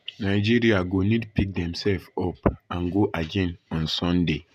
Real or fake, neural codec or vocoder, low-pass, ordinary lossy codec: fake; vocoder, 48 kHz, 128 mel bands, Vocos; 14.4 kHz; none